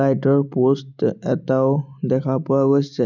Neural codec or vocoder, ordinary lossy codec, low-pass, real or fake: none; none; 7.2 kHz; real